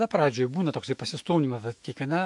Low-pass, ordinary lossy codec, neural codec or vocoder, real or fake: 10.8 kHz; AAC, 64 kbps; autoencoder, 48 kHz, 128 numbers a frame, DAC-VAE, trained on Japanese speech; fake